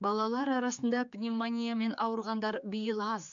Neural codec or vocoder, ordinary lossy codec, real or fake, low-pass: codec, 16 kHz, 4 kbps, X-Codec, HuBERT features, trained on general audio; MP3, 64 kbps; fake; 7.2 kHz